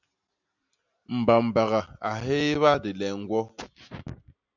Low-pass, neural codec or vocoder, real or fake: 7.2 kHz; none; real